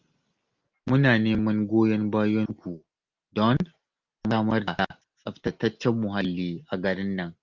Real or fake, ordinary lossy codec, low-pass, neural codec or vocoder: real; Opus, 32 kbps; 7.2 kHz; none